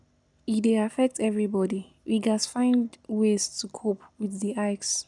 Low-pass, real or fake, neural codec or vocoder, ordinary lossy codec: 10.8 kHz; real; none; none